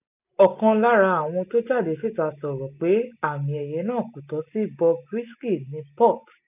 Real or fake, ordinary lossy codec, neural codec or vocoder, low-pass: real; none; none; 3.6 kHz